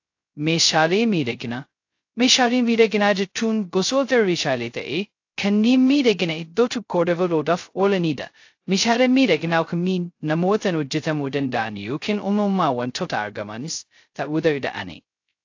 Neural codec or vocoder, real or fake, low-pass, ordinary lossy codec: codec, 16 kHz, 0.2 kbps, FocalCodec; fake; 7.2 kHz; AAC, 48 kbps